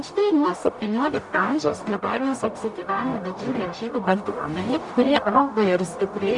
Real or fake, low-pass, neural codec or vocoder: fake; 10.8 kHz; codec, 44.1 kHz, 0.9 kbps, DAC